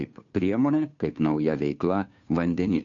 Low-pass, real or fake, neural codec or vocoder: 7.2 kHz; fake; codec, 16 kHz, 2 kbps, FunCodec, trained on Chinese and English, 25 frames a second